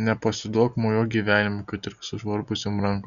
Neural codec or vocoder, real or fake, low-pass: none; real; 7.2 kHz